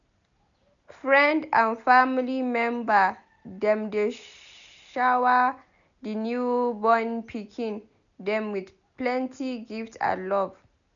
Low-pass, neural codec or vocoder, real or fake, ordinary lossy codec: 7.2 kHz; none; real; MP3, 96 kbps